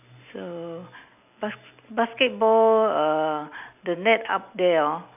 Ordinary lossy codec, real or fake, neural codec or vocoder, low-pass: none; real; none; 3.6 kHz